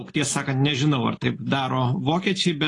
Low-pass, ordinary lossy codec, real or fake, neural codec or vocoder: 10.8 kHz; AAC, 32 kbps; real; none